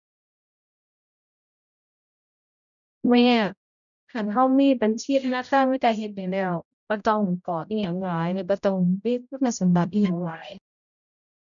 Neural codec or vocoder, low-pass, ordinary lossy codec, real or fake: codec, 16 kHz, 0.5 kbps, X-Codec, HuBERT features, trained on general audio; 7.2 kHz; none; fake